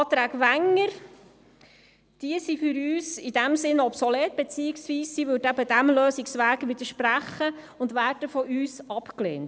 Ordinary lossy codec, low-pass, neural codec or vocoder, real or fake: none; none; none; real